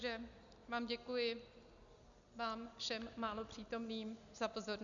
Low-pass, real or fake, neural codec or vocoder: 7.2 kHz; real; none